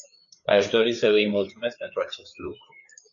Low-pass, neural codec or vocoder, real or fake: 7.2 kHz; codec, 16 kHz, 4 kbps, FreqCodec, larger model; fake